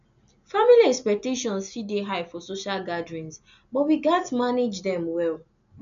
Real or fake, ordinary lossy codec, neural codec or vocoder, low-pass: real; none; none; 7.2 kHz